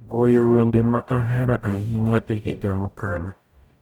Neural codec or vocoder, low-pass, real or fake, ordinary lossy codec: codec, 44.1 kHz, 0.9 kbps, DAC; 19.8 kHz; fake; none